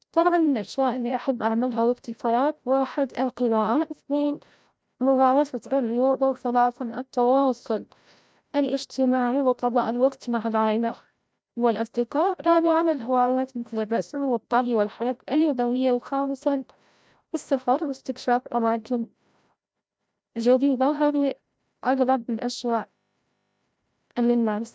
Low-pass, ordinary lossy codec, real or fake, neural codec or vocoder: none; none; fake; codec, 16 kHz, 0.5 kbps, FreqCodec, larger model